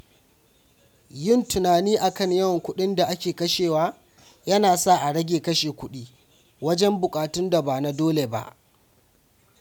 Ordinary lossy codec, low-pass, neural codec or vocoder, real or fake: none; none; none; real